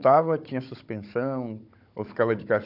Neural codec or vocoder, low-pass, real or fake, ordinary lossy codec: codec, 16 kHz, 16 kbps, FunCodec, trained on Chinese and English, 50 frames a second; 5.4 kHz; fake; none